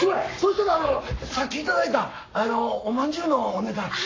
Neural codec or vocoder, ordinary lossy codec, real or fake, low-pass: codec, 44.1 kHz, 7.8 kbps, Pupu-Codec; AAC, 32 kbps; fake; 7.2 kHz